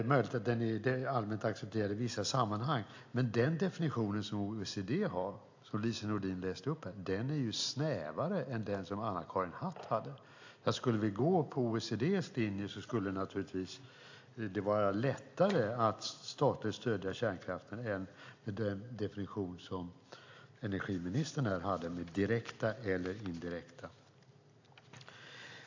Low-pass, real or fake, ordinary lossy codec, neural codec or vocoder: 7.2 kHz; real; AAC, 48 kbps; none